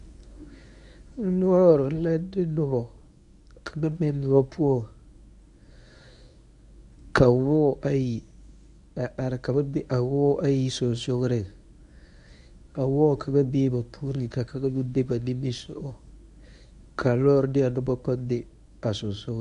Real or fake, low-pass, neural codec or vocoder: fake; 10.8 kHz; codec, 24 kHz, 0.9 kbps, WavTokenizer, medium speech release version 1